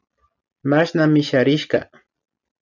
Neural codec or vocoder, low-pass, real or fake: none; 7.2 kHz; real